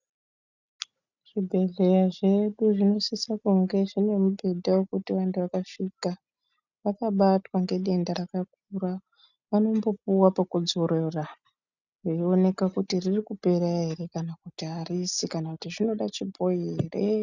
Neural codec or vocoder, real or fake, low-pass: none; real; 7.2 kHz